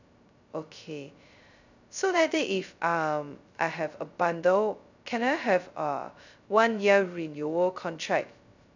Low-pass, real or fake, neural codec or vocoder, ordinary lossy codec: 7.2 kHz; fake; codec, 16 kHz, 0.2 kbps, FocalCodec; none